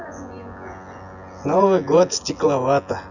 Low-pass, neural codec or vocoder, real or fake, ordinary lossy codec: 7.2 kHz; vocoder, 24 kHz, 100 mel bands, Vocos; fake; none